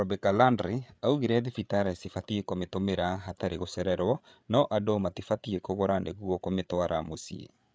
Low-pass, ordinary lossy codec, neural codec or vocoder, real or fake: none; none; codec, 16 kHz, 8 kbps, FreqCodec, larger model; fake